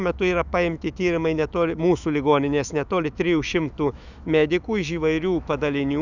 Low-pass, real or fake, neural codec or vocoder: 7.2 kHz; fake; autoencoder, 48 kHz, 128 numbers a frame, DAC-VAE, trained on Japanese speech